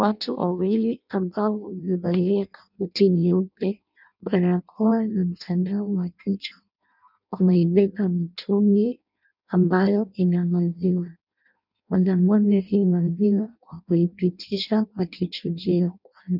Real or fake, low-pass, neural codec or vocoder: fake; 5.4 kHz; codec, 16 kHz in and 24 kHz out, 0.6 kbps, FireRedTTS-2 codec